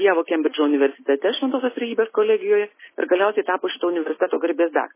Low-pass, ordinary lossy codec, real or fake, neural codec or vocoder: 3.6 kHz; MP3, 16 kbps; real; none